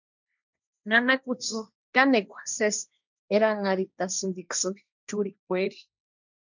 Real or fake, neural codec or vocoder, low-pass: fake; codec, 16 kHz, 1.1 kbps, Voila-Tokenizer; 7.2 kHz